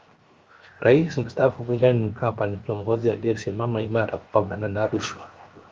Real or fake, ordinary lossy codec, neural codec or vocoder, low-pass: fake; Opus, 32 kbps; codec, 16 kHz, 0.7 kbps, FocalCodec; 7.2 kHz